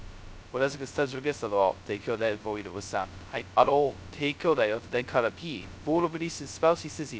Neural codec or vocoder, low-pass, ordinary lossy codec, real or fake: codec, 16 kHz, 0.2 kbps, FocalCodec; none; none; fake